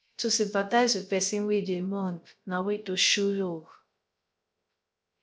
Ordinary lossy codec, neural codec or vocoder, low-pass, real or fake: none; codec, 16 kHz, 0.3 kbps, FocalCodec; none; fake